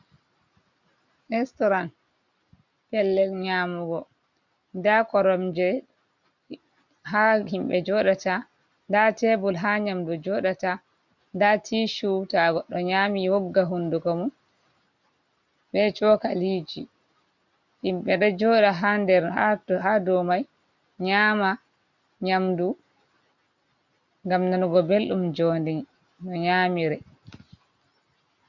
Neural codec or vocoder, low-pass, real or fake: none; 7.2 kHz; real